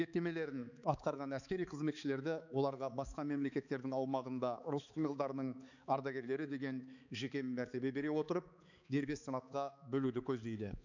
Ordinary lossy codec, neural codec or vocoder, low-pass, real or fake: none; codec, 16 kHz, 4 kbps, X-Codec, HuBERT features, trained on balanced general audio; 7.2 kHz; fake